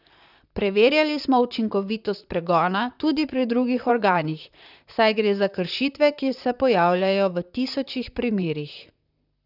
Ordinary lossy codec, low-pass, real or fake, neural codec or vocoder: none; 5.4 kHz; fake; vocoder, 44.1 kHz, 128 mel bands, Pupu-Vocoder